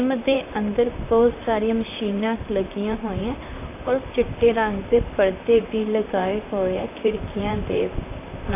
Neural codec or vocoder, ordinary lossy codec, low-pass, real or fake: vocoder, 44.1 kHz, 128 mel bands, Pupu-Vocoder; AAC, 24 kbps; 3.6 kHz; fake